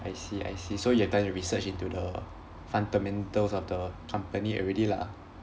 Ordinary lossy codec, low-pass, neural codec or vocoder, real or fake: none; none; none; real